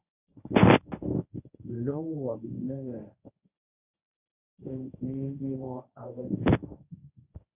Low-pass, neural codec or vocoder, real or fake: 3.6 kHz; codec, 24 kHz, 0.9 kbps, WavTokenizer, medium speech release version 1; fake